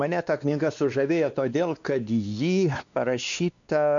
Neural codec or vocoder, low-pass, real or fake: codec, 16 kHz, 2 kbps, X-Codec, WavLM features, trained on Multilingual LibriSpeech; 7.2 kHz; fake